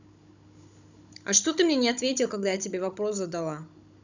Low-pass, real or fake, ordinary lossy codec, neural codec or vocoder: 7.2 kHz; fake; none; codec, 16 kHz, 16 kbps, FunCodec, trained on Chinese and English, 50 frames a second